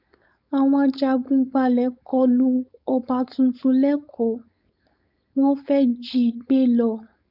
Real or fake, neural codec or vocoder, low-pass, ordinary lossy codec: fake; codec, 16 kHz, 4.8 kbps, FACodec; 5.4 kHz; none